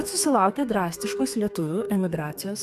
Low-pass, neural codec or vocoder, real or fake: 14.4 kHz; codec, 32 kHz, 1.9 kbps, SNAC; fake